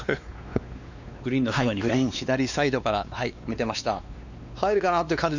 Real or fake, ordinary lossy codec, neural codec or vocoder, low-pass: fake; none; codec, 16 kHz, 2 kbps, X-Codec, WavLM features, trained on Multilingual LibriSpeech; 7.2 kHz